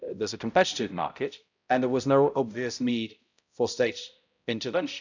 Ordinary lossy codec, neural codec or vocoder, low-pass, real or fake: none; codec, 16 kHz, 0.5 kbps, X-Codec, HuBERT features, trained on balanced general audio; 7.2 kHz; fake